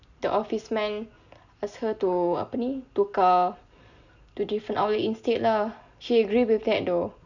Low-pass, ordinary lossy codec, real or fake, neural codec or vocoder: 7.2 kHz; none; real; none